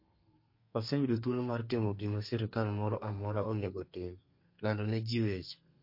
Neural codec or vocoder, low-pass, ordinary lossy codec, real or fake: codec, 32 kHz, 1.9 kbps, SNAC; 5.4 kHz; MP3, 32 kbps; fake